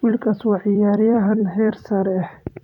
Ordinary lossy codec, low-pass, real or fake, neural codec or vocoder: none; 19.8 kHz; fake; vocoder, 44.1 kHz, 128 mel bands every 256 samples, BigVGAN v2